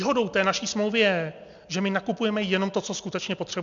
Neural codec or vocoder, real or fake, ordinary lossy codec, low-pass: none; real; MP3, 64 kbps; 7.2 kHz